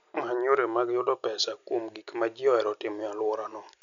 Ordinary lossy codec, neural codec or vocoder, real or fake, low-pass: none; none; real; 7.2 kHz